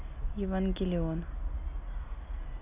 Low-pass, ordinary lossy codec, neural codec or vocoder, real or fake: 3.6 kHz; none; none; real